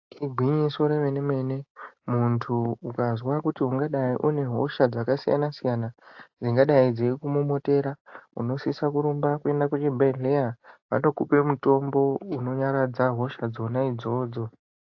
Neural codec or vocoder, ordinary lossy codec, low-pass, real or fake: none; AAC, 48 kbps; 7.2 kHz; real